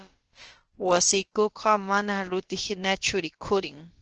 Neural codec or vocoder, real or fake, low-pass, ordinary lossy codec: codec, 16 kHz, about 1 kbps, DyCAST, with the encoder's durations; fake; 7.2 kHz; Opus, 16 kbps